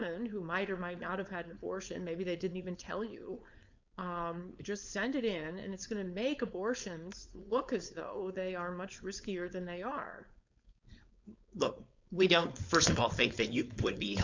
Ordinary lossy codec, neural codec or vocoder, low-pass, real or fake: MP3, 64 kbps; codec, 16 kHz, 4.8 kbps, FACodec; 7.2 kHz; fake